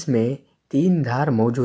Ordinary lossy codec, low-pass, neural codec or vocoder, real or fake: none; none; none; real